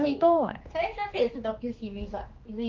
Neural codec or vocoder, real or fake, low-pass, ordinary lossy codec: codec, 16 kHz, 2 kbps, X-Codec, HuBERT features, trained on general audio; fake; 7.2 kHz; Opus, 32 kbps